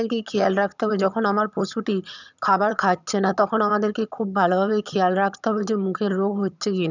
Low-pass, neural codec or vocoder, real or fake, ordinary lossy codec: 7.2 kHz; vocoder, 22.05 kHz, 80 mel bands, HiFi-GAN; fake; none